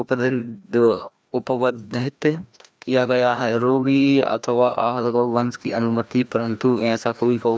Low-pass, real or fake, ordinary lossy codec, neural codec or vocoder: none; fake; none; codec, 16 kHz, 1 kbps, FreqCodec, larger model